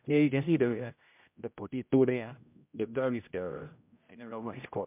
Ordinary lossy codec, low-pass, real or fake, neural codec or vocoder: MP3, 32 kbps; 3.6 kHz; fake; codec, 16 kHz, 0.5 kbps, X-Codec, HuBERT features, trained on general audio